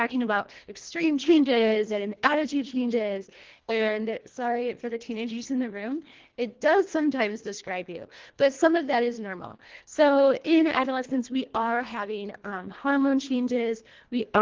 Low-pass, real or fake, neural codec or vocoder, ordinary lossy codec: 7.2 kHz; fake; codec, 24 kHz, 1.5 kbps, HILCodec; Opus, 16 kbps